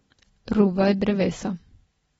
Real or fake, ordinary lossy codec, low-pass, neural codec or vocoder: real; AAC, 24 kbps; 19.8 kHz; none